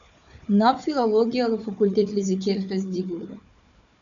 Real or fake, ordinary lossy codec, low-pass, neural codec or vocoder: fake; MP3, 96 kbps; 7.2 kHz; codec, 16 kHz, 4 kbps, FunCodec, trained on Chinese and English, 50 frames a second